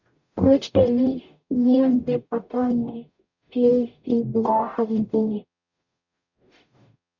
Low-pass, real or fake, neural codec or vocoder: 7.2 kHz; fake; codec, 44.1 kHz, 0.9 kbps, DAC